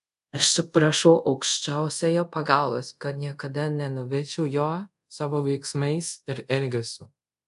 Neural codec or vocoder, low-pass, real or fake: codec, 24 kHz, 0.5 kbps, DualCodec; 10.8 kHz; fake